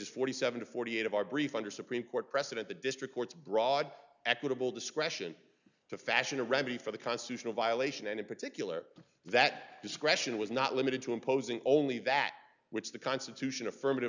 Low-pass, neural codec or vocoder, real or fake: 7.2 kHz; none; real